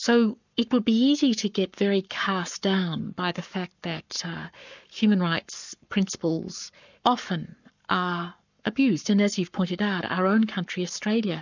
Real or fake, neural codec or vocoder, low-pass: fake; codec, 44.1 kHz, 7.8 kbps, Pupu-Codec; 7.2 kHz